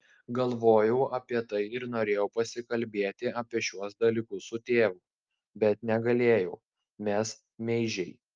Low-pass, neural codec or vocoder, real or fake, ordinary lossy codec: 7.2 kHz; none; real; Opus, 24 kbps